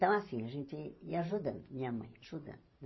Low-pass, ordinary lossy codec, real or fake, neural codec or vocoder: 7.2 kHz; MP3, 24 kbps; fake; vocoder, 44.1 kHz, 128 mel bands every 512 samples, BigVGAN v2